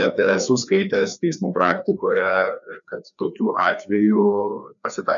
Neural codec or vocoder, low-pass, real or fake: codec, 16 kHz, 2 kbps, FreqCodec, larger model; 7.2 kHz; fake